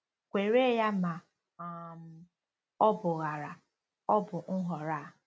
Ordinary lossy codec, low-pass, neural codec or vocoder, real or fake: none; none; none; real